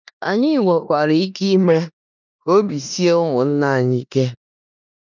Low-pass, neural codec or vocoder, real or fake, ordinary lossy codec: 7.2 kHz; codec, 16 kHz in and 24 kHz out, 0.9 kbps, LongCat-Audio-Codec, four codebook decoder; fake; none